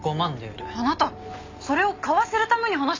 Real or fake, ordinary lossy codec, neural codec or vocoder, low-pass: real; MP3, 64 kbps; none; 7.2 kHz